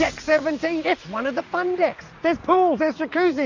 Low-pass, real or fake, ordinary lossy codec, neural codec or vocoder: 7.2 kHz; fake; AAC, 48 kbps; vocoder, 44.1 kHz, 80 mel bands, Vocos